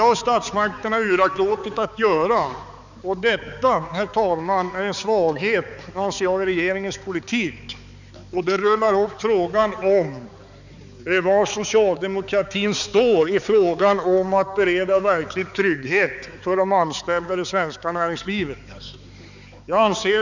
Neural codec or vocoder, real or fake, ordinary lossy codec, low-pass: codec, 16 kHz, 4 kbps, X-Codec, HuBERT features, trained on balanced general audio; fake; none; 7.2 kHz